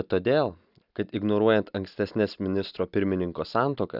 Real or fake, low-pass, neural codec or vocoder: real; 5.4 kHz; none